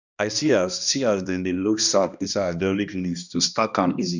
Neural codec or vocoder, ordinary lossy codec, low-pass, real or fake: codec, 16 kHz, 1 kbps, X-Codec, HuBERT features, trained on balanced general audio; none; 7.2 kHz; fake